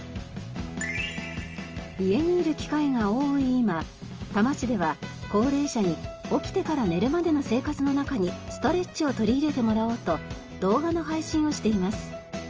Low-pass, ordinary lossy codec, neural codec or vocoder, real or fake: 7.2 kHz; Opus, 24 kbps; none; real